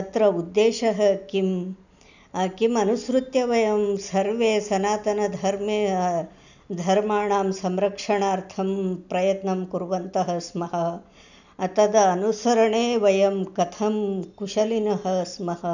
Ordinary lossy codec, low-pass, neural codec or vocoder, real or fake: none; 7.2 kHz; none; real